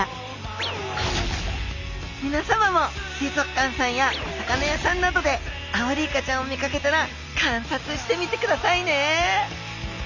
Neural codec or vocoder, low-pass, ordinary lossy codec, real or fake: none; 7.2 kHz; none; real